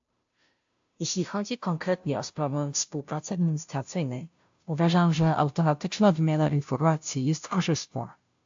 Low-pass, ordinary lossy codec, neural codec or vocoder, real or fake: 7.2 kHz; AAC, 64 kbps; codec, 16 kHz, 0.5 kbps, FunCodec, trained on Chinese and English, 25 frames a second; fake